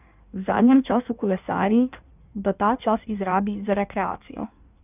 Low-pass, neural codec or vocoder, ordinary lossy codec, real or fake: 3.6 kHz; codec, 16 kHz in and 24 kHz out, 1.1 kbps, FireRedTTS-2 codec; none; fake